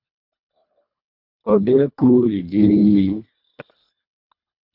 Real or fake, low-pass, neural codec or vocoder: fake; 5.4 kHz; codec, 24 kHz, 1.5 kbps, HILCodec